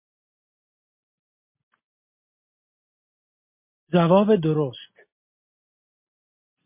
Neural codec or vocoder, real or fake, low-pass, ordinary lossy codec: none; real; 3.6 kHz; MP3, 24 kbps